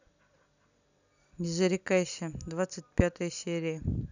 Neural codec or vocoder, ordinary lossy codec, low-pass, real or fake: none; none; 7.2 kHz; real